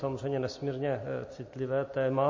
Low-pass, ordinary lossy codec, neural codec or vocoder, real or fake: 7.2 kHz; MP3, 32 kbps; none; real